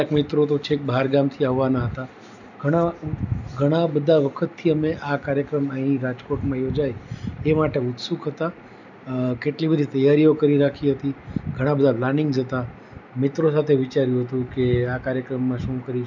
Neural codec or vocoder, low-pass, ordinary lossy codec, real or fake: none; 7.2 kHz; none; real